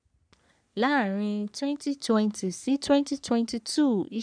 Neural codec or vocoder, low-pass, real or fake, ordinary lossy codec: codec, 44.1 kHz, 3.4 kbps, Pupu-Codec; 9.9 kHz; fake; none